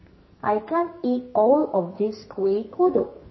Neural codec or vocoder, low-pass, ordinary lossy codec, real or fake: codec, 44.1 kHz, 2.6 kbps, SNAC; 7.2 kHz; MP3, 24 kbps; fake